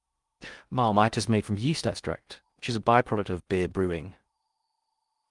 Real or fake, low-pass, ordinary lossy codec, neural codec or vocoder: fake; 10.8 kHz; Opus, 32 kbps; codec, 16 kHz in and 24 kHz out, 0.6 kbps, FocalCodec, streaming, 2048 codes